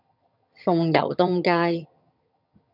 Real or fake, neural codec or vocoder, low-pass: fake; vocoder, 22.05 kHz, 80 mel bands, HiFi-GAN; 5.4 kHz